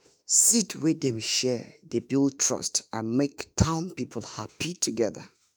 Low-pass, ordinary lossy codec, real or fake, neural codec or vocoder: none; none; fake; autoencoder, 48 kHz, 32 numbers a frame, DAC-VAE, trained on Japanese speech